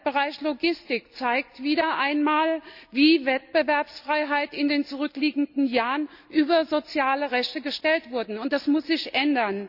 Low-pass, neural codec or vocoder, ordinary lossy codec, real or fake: 5.4 kHz; none; Opus, 64 kbps; real